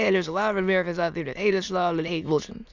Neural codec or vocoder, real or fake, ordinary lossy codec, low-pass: autoencoder, 22.05 kHz, a latent of 192 numbers a frame, VITS, trained on many speakers; fake; Opus, 64 kbps; 7.2 kHz